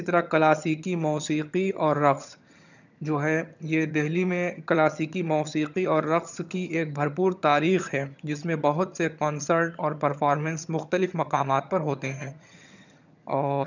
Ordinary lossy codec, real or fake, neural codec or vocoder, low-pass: none; fake; vocoder, 22.05 kHz, 80 mel bands, HiFi-GAN; 7.2 kHz